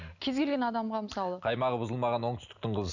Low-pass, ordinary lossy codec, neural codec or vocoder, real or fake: 7.2 kHz; none; none; real